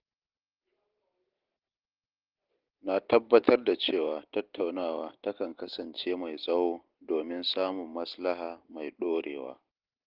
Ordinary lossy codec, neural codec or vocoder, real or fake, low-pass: Opus, 16 kbps; none; real; 5.4 kHz